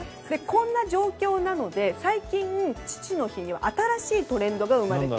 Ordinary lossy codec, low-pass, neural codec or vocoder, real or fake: none; none; none; real